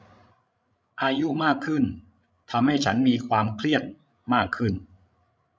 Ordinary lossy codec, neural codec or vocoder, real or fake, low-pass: none; codec, 16 kHz, 16 kbps, FreqCodec, larger model; fake; none